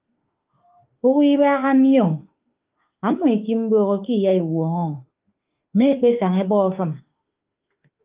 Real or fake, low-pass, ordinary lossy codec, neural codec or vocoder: fake; 3.6 kHz; Opus, 24 kbps; autoencoder, 48 kHz, 32 numbers a frame, DAC-VAE, trained on Japanese speech